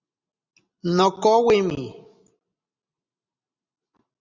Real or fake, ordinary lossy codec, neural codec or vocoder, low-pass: real; Opus, 64 kbps; none; 7.2 kHz